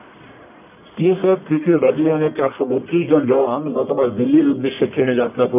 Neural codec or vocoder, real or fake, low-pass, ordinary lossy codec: codec, 44.1 kHz, 1.7 kbps, Pupu-Codec; fake; 3.6 kHz; none